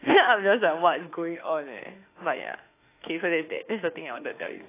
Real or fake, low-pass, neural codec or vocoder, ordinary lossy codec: fake; 3.6 kHz; autoencoder, 48 kHz, 32 numbers a frame, DAC-VAE, trained on Japanese speech; AAC, 24 kbps